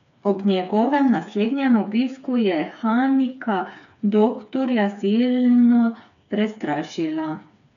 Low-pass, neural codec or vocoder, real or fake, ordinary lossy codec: 7.2 kHz; codec, 16 kHz, 4 kbps, FreqCodec, smaller model; fake; none